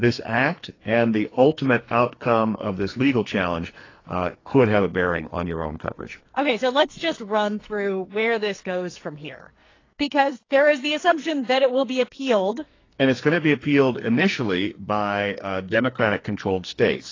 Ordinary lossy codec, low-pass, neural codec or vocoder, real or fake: AAC, 32 kbps; 7.2 kHz; codec, 44.1 kHz, 2.6 kbps, SNAC; fake